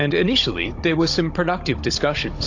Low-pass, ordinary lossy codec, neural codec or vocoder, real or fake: 7.2 kHz; AAC, 48 kbps; codec, 16 kHz, 16 kbps, FunCodec, trained on Chinese and English, 50 frames a second; fake